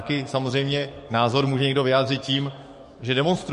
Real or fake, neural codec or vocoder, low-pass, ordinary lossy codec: fake; codec, 44.1 kHz, 7.8 kbps, DAC; 14.4 kHz; MP3, 48 kbps